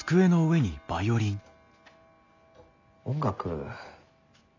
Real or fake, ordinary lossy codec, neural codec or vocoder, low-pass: real; AAC, 48 kbps; none; 7.2 kHz